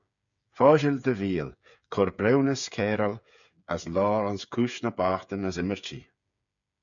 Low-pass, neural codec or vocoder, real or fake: 7.2 kHz; codec, 16 kHz, 8 kbps, FreqCodec, smaller model; fake